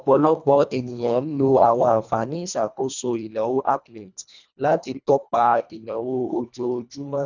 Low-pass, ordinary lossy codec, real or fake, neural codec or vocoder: 7.2 kHz; none; fake; codec, 24 kHz, 1.5 kbps, HILCodec